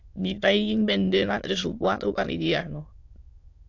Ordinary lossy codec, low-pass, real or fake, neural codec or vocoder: AAC, 48 kbps; 7.2 kHz; fake; autoencoder, 22.05 kHz, a latent of 192 numbers a frame, VITS, trained on many speakers